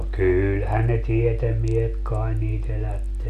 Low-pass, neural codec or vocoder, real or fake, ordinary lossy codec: 14.4 kHz; none; real; none